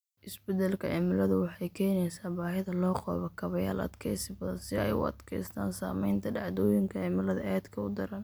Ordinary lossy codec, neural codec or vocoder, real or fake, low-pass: none; none; real; none